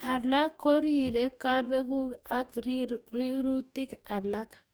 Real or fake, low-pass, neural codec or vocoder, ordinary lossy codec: fake; none; codec, 44.1 kHz, 2.6 kbps, DAC; none